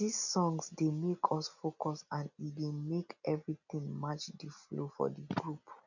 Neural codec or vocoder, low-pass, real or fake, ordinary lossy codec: none; 7.2 kHz; real; none